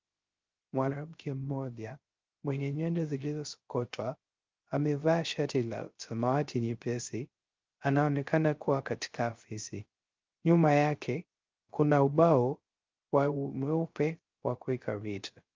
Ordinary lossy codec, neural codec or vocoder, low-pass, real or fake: Opus, 32 kbps; codec, 16 kHz, 0.3 kbps, FocalCodec; 7.2 kHz; fake